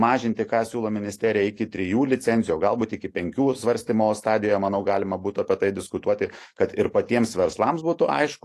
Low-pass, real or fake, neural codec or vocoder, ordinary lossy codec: 14.4 kHz; fake; autoencoder, 48 kHz, 128 numbers a frame, DAC-VAE, trained on Japanese speech; AAC, 48 kbps